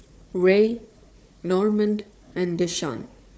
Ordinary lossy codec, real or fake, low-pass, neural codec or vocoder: none; fake; none; codec, 16 kHz, 4 kbps, FunCodec, trained on Chinese and English, 50 frames a second